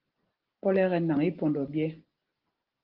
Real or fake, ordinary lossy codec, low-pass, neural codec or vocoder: real; Opus, 16 kbps; 5.4 kHz; none